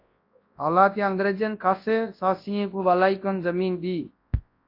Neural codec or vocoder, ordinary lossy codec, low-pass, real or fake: codec, 24 kHz, 0.9 kbps, WavTokenizer, large speech release; AAC, 32 kbps; 5.4 kHz; fake